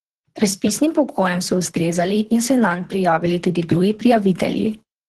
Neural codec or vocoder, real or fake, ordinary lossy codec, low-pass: codec, 24 kHz, 3 kbps, HILCodec; fake; Opus, 16 kbps; 10.8 kHz